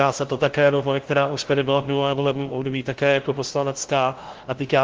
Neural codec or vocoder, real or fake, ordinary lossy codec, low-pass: codec, 16 kHz, 0.5 kbps, FunCodec, trained on LibriTTS, 25 frames a second; fake; Opus, 16 kbps; 7.2 kHz